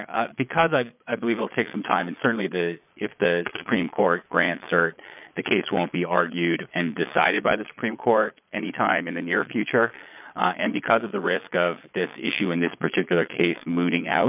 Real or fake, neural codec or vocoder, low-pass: fake; vocoder, 22.05 kHz, 80 mel bands, Vocos; 3.6 kHz